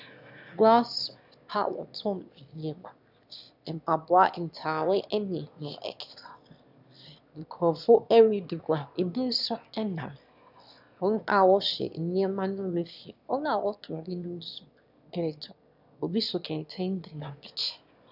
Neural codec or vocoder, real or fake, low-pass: autoencoder, 22.05 kHz, a latent of 192 numbers a frame, VITS, trained on one speaker; fake; 5.4 kHz